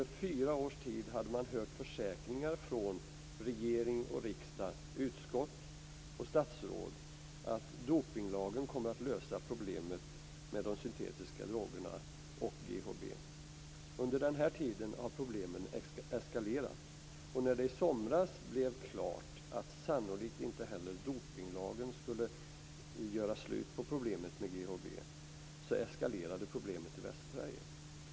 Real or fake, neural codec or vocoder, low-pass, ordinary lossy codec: real; none; none; none